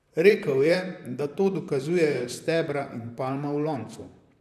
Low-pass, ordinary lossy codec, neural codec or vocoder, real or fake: 14.4 kHz; none; vocoder, 44.1 kHz, 128 mel bands, Pupu-Vocoder; fake